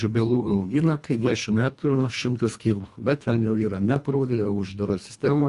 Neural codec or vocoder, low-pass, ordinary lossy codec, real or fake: codec, 24 kHz, 1.5 kbps, HILCodec; 10.8 kHz; Opus, 64 kbps; fake